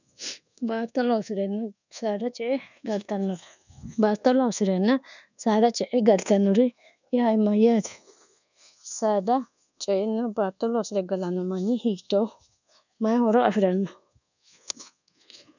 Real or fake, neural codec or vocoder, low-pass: fake; codec, 24 kHz, 1.2 kbps, DualCodec; 7.2 kHz